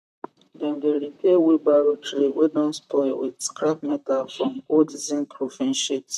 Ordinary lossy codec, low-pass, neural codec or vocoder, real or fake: none; 14.4 kHz; vocoder, 44.1 kHz, 128 mel bands, Pupu-Vocoder; fake